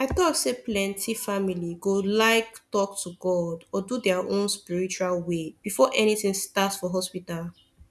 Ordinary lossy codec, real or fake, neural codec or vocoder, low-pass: none; real; none; none